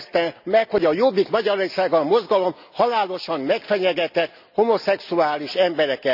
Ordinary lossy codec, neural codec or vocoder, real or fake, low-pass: none; none; real; 5.4 kHz